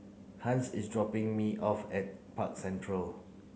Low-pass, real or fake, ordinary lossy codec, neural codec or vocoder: none; real; none; none